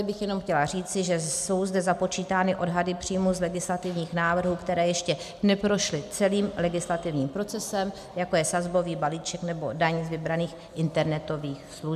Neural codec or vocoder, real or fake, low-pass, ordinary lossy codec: none; real; 14.4 kHz; MP3, 96 kbps